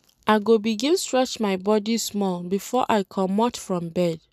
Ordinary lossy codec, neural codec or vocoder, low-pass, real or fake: none; none; 14.4 kHz; real